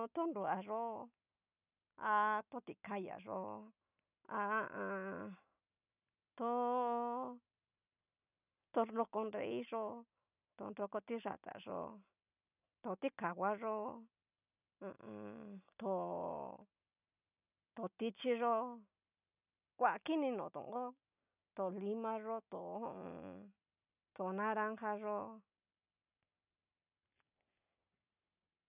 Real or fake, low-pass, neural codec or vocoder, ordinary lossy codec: real; 3.6 kHz; none; none